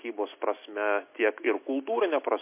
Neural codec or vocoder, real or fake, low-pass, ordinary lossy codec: none; real; 3.6 kHz; MP3, 24 kbps